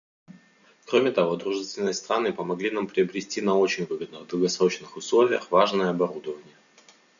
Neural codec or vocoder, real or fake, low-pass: none; real; 7.2 kHz